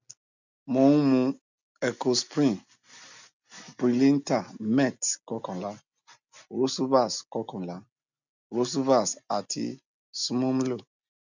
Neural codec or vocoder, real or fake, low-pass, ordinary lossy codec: none; real; 7.2 kHz; none